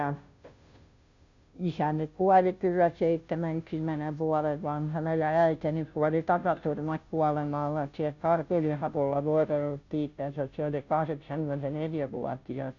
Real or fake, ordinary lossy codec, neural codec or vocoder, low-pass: fake; none; codec, 16 kHz, 0.5 kbps, FunCodec, trained on Chinese and English, 25 frames a second; 7.2 kHz